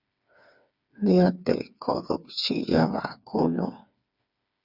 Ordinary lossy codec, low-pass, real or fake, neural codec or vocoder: Opus, 64 kbps; 5.4 kHz; fake; codec, 16 kHz, 4 kbps, FreqCodec, smaller model